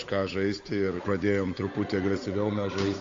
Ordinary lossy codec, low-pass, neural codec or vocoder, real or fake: AAC, 48 kbps; 7.2 kHz; codec, 16 kHz, 8 kbps, FunCodec, trained on Chinese and English, 25 frames a second; fake